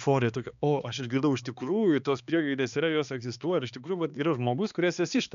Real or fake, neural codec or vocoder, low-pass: fake; codec, 16 kHz, 2 kbps, X-Codec, HuBERT features, trained on balanced general audio; 7.2 kHz